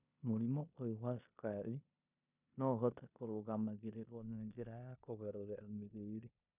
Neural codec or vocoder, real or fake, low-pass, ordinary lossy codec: codec, 16 kHz in and 24 kHz out, 0.9 kbps, LongCat-Audio-Codec, four codebook decoder; fake; 3.6 kHz; none